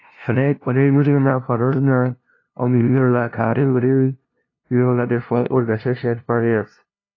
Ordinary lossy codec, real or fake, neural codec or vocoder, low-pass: AAC, 32 kbps; fake; codec, 16 kHz, 0.5 kbps, FunCodec, trained on LibriTTS, 25 frames a second; 7.2 kHz